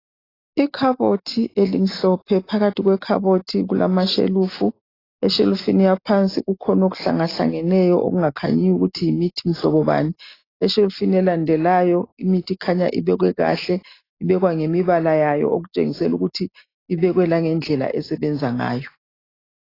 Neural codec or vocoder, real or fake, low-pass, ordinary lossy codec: none; real; 5.4 kHz; AAC, 24 kbps